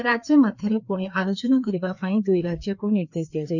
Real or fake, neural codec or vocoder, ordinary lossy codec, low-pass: fake; codec, 16 kHz, 2 kbps, FreqCodec, larger model; none; 7.2 kHz